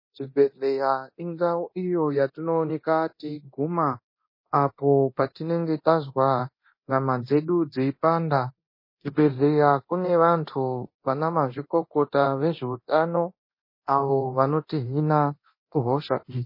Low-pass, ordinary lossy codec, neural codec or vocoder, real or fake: 5.4 kHz; MP3, 24 kbps; codec, 24 kHz, 0.9 kbps, DualCodec; fake